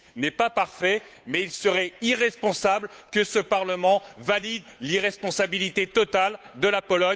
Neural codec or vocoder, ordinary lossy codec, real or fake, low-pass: codec, 16 kHz, 8 kbps, FunCodec, trained on Chinese and English, 25 frames a second; none; fake; none